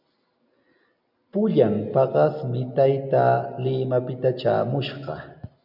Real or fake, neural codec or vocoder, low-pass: real; none; 5.4 kHz